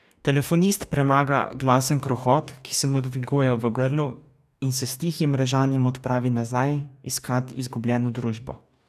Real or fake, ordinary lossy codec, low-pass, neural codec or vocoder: fake; none; 14.4 kHz; codec, 44.1 kHz, 2.6 kbps, DAC